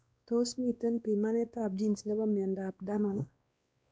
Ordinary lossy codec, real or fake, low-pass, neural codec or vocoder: none; fake; none; codec, 16 kHz, 2 kbps, X-Codec, WavLM features, trained on Multilingual LibriSpeech